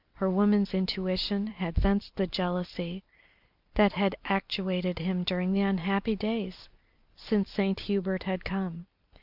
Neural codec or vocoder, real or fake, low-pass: vocoder, 44.1 kHz, 128 mel bands every 256 samples, BigVGAN v2; fake; 5.4 kHz